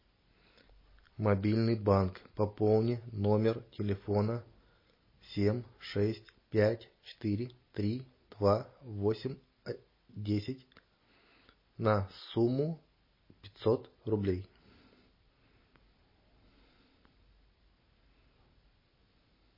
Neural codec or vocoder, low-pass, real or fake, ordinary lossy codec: none; 5.4 kHz; real; MP3, 24 kbps